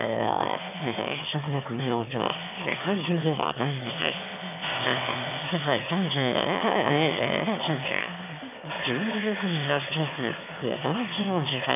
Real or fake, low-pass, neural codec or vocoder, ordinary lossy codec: fake; 3.6 kHz; autoencoder, 22.05 kHz, a latent of 192 numbers a frame, VITS, trained on one speaker; none